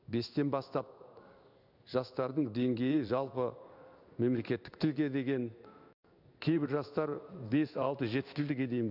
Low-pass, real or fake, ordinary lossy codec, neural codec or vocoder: 5.4 kHz; fake; none; codec, 16 kHz in and 24 kHz out, 1 kbps, XY-Tokenizer